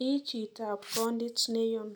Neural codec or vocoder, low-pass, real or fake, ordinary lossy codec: none; none; real; none